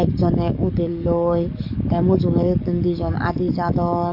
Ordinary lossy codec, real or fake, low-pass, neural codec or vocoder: none; fake; 5.4 kHz; codec, 44.1 kHz, 7.8 kbps, DAC